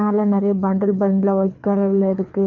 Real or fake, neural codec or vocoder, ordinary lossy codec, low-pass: fake; codec, 24 kHz, 6 kbps, HILCodec; none; 7.2 kHz